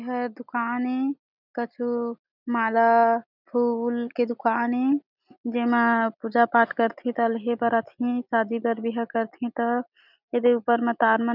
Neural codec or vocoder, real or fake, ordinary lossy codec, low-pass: none; real; none; 5.4 kHz